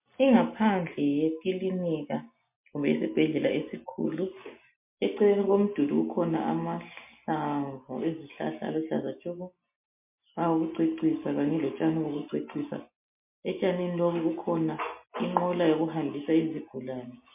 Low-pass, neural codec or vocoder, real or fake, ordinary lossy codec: 3.6 kHz; none; real; MP3, 32 kbps